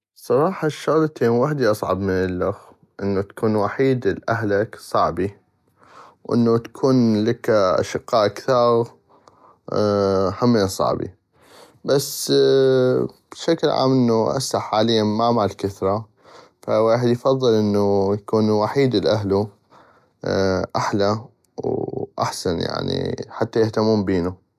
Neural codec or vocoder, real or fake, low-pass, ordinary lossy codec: none; real; 14.4 kHz; none